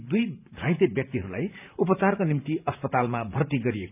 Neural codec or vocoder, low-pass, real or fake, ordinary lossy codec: vocoder, 44.1 kHz, 128 mel bands every 512 samples, BigVGAN v2; 3.6 kHz; fake; none